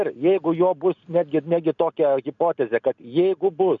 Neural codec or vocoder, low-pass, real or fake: none; 7.2 kHz; real